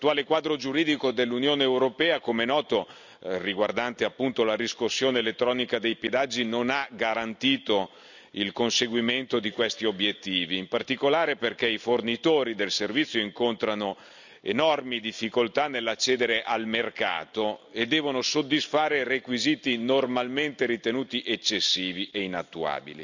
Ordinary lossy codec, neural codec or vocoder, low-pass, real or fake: none; none; 7.2 kHz; real